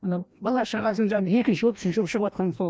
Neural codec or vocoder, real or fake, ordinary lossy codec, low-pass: codec, 16 kHz, 1 kbps, FreqCodec, larger model; fake; none; none